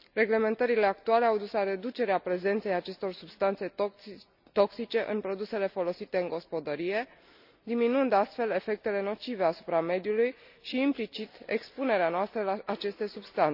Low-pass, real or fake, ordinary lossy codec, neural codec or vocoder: 5.4 kHz; real; none; none